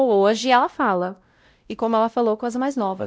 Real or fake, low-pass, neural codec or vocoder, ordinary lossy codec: fake; none; codec, 16 kHz, 0.5 kbps, X-Codec, WavLM features, trained on Multilingual LibriSpeech; none